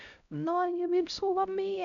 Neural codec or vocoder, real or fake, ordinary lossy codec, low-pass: codec, 16 kHz, 0.5 kbps, X-Codec, HuBERT features, trained on LibriSpeech; fake; none; 7.2 kHz